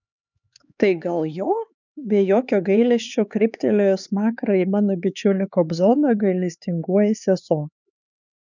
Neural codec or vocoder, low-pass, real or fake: codec, 16 kHz, 4 kbps, X-Codec, HuBERT features, trained on LibriSpeech; 7.2 kHz; fake